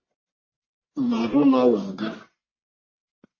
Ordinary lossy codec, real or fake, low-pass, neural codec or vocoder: MP3, 32 kbps; fake; 7.2 kHz; codec, 44.1 kHz, 1.7 kbps, Pupu-Codec